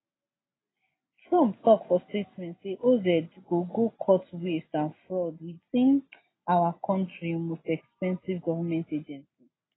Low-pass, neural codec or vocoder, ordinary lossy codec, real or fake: 7.2 kHz; none; AAC, 16 kbps; real